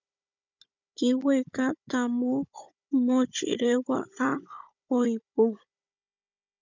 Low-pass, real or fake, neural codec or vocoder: 7.2 kHz; fake; codec, 16 kHz, 16 kbps, FunCodec, trained on Chinese and English, 50 frames a second